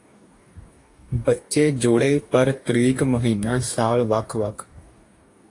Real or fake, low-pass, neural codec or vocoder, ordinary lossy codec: fake; 10.8 kHz; codec, 44.1 kHz, 2.6 kbps, DAC; AAC, 48 kbps